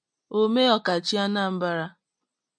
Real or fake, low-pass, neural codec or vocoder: real; 9.9 kHz; none